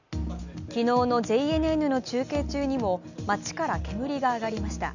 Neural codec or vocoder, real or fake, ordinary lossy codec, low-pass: none; real; none; 7.2 kHz